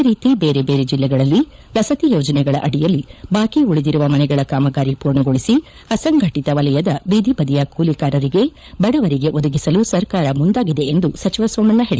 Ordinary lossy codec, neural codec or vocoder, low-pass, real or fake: none; codec, 16 kHz, 16 kbps, FunCodec, trained on LibriTTS, 50 frames a second; none; fake